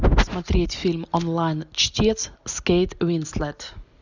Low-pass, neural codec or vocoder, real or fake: 7.2 kHz; none; real